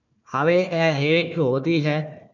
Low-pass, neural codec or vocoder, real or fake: 7.2 kHz; codec, 16 kHz, 1 kbps, FunCodec, trained on Chinese and English, 50 frames a second; fake